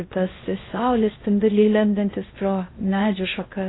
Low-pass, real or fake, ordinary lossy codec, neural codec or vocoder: 7.2 kHz; fake; AAC, 16 kbps; codec, 16 kHz in and 24 kHz out, 0.6 kbps, FocalCodec, streaming, 4096 codes